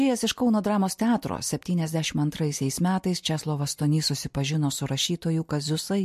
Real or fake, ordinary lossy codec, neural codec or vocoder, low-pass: real; MP3, 64 kbps; none; 14.4 kHz